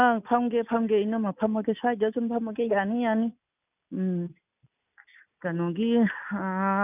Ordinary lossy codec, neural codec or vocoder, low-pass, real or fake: none; none; 3.6 kHz; real